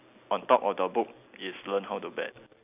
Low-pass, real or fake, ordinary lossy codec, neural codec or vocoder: 3.6 kHz; real; none; none